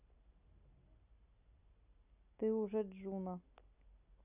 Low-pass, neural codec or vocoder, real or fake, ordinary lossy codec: 3.6 kHz; none; real; none